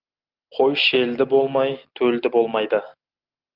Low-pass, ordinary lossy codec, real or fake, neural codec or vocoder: 5.4 kHz; Opus, 24 kbps; real; none